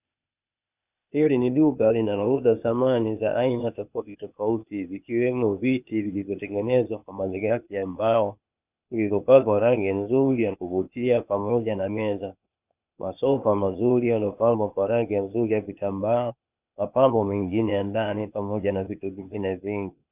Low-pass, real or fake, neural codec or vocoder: 3.6 kHz; fake; codec, 16 kHz, 0.8 kbps, ZipCodec